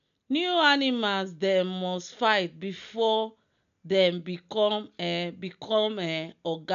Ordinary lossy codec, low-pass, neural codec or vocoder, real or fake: none; 7.2 kHz; none; real